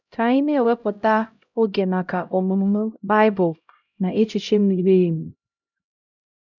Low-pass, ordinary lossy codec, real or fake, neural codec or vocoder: 7.2 kHz; none; fake; codec, 16 kHz, 0.5 kbps, X-Codec, HuBERT features, trained on LibriSpeech